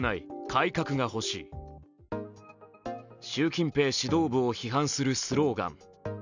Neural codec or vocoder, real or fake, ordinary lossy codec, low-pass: none; real; none; 7.2 kHz